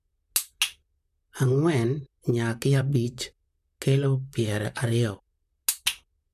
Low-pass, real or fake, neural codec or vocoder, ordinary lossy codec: 14.4 kHz; fake; vocoder, 44.1 kHz, 128 mel bands, Pupu-Vocoder; none